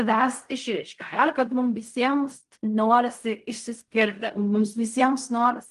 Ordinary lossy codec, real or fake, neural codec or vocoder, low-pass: Opus, 24 kbps; fake; codec, 16 kHz in and 24 kHz out, 0.4 kbps, LongCat-Audio-Codec, fine tuned four codebook decoder; 10.8 kHz